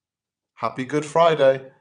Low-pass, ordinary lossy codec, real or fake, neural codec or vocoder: 9.9 kHz; none; fake; vocoder, 22.05 kHz, 80 mel bands, WaveNeXt